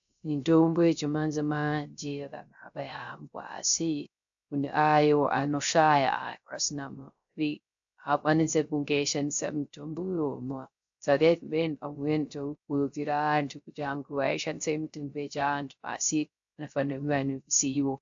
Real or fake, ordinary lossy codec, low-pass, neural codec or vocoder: fake; AAC, 64 kbps; 7.2 kHz; codec, 16 kHz, 0.3 kbps, FocalCodec